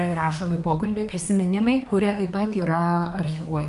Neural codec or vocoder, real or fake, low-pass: codec, 24 kHz, 1 kbps, SNAC; fake; 10.8 kHz